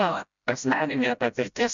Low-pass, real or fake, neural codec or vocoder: 7.2 kHz; fake; codec, 16 kHz, 0.5 kbps, FreqCodec, smaller model